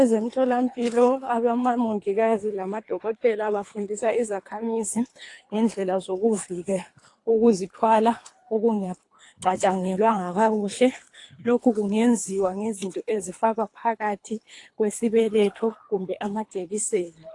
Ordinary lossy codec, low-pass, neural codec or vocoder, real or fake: AAC, 48 kbps; 10.8 kHz; codec, 24 kHz, 3 kbps, HILCodec; fake